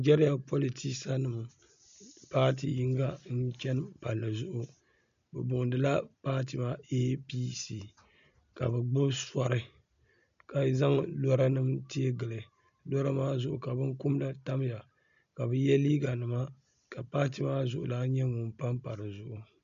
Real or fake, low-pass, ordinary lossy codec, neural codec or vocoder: real; 7.2 kHz; MP3, 64 kbps; none